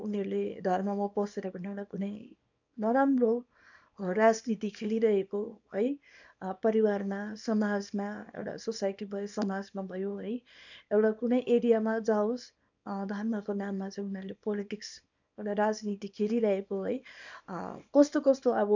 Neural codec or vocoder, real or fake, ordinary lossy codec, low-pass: codec, 24 kHz, 0.9 kbps, WavTokenizer, small release; fake; none; 7.2 kHz